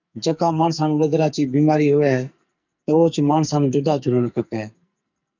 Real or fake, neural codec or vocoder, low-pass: fake; codec, 44.1 kHz, 2.6 kbps, SNAC; 7.2 kHz